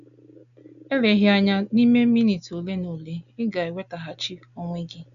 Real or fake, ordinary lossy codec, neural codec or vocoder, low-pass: real; none; none; 7.2 kHz